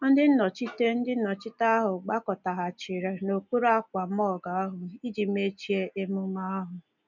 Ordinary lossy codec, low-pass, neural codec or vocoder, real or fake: none; 7.2 kHz; none; real